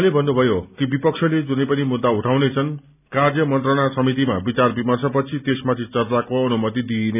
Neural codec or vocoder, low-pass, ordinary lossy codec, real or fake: none; 3.6 kHz; none; real